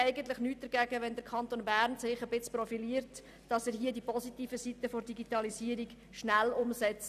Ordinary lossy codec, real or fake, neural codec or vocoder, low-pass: none; real; none; 14.4 kHz